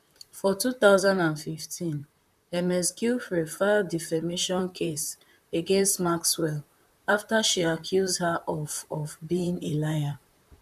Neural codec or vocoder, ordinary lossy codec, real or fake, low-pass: vocoder, 44.1 kHz, 128 mel bands, Pupu-Vocoder; none; fake; 14.4 kHz